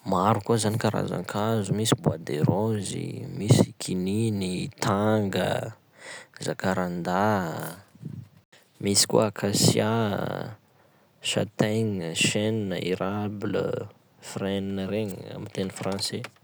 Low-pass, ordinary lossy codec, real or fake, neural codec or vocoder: none; none; real; none